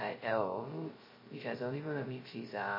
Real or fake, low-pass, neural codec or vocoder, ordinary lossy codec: fake; 5.4 kHz; codec, 16 kHz, 0.2 kbps, FocalCodec; MP3, 24 kbps